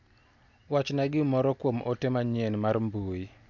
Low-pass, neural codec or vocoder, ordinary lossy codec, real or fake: 7.2 kHz; none; MP3, 64 kbps; real